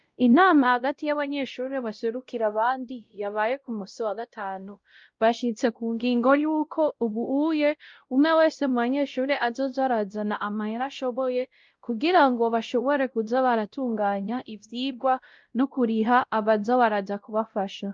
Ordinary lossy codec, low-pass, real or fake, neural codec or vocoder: Opus, 32 kbps; 7.2 kHz; fake; codec, 16 kHz, 0.5 kbps, X-Codec, WavLM features, trained on Multilingual LibriSpeech